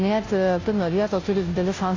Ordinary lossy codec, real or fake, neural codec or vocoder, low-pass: AAC, 32 kbps; fake; codec, 16 kHz, 0.5 kbps, FunCodec, trained on Chinese and English, 25 frames a second; 7.2 kHz